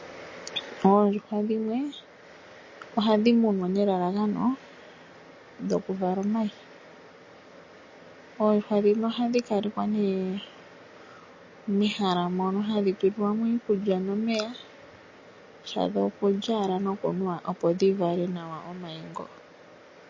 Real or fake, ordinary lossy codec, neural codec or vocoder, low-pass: real; MP3, 32 kbps; none; 7.2 kHz